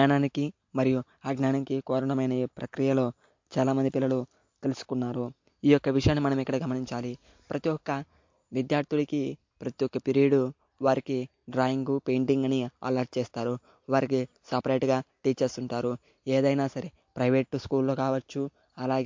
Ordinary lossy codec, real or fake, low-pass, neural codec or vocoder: MP3, 48 kbps; real; 7.2 kHz; none